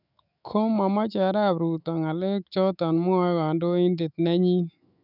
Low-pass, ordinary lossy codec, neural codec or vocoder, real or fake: 5.4 kHz; none; autoencoder, 48 kHz, 128 numbers a frame, DAC-VAE, trained on Japanese speech; fake